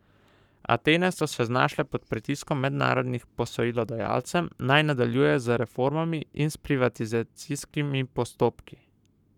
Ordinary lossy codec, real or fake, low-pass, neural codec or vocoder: none; fake; 19.8 kHz; codec, 44.1 kHz, 7.8 kbps, Pupu-Codec